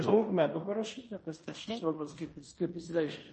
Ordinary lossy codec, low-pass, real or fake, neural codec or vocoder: MP3, 32 kbps; 10.8 kHz; fake; codec, 16 kHz in and 24 kHz out, 0.9 kbps, LongCat-Audio-Codec, fine tuned four codebook decoder